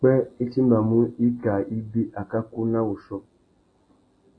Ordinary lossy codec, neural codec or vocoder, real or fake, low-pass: AAC, 32 kbps; none; real; 9.9 kHz